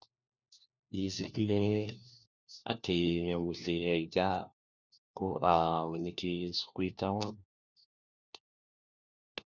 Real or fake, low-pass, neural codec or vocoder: fake; 7.2 kHz; codec, 16 kHz, 1 kbps, FunCodec, trained on LibriTTS, 50 frames a second